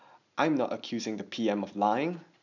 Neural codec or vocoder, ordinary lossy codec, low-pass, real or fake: none; none; 7.2 kHz; real